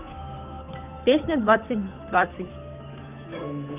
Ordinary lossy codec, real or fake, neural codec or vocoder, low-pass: none; fake; codec, 16 kHz, 8 kbps, FunCodec, trained on Chinese and English, 25 frames a second; 3.6 kHz